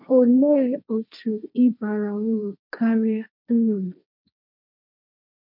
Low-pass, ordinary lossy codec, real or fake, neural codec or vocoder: 5.4 kHz; none; fake; codec, 16 kHz, 1.1 kbps, Voila-Tokenizer